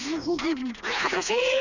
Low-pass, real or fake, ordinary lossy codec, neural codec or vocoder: 7.2 kHz; fake; none; codec, 16 kHz, 2 kbps, FreqCodec, smaller model